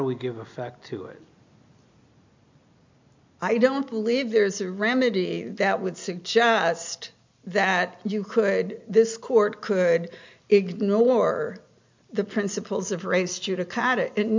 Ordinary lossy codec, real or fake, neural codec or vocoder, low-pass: MP3, 64 kbps; real; none; 7.2 kHz